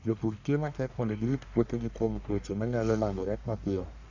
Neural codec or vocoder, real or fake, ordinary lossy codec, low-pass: codec, 44.1 kHz, 1.7 kbps, Pupu-Codec; fake; AAC, 48 kbps; 7.2 kHz